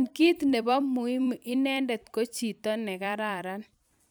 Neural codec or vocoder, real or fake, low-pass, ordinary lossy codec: vocoder, 44.1 kHz, 128 mel bands every 256 samples, BigVGAN v2; fake; none; none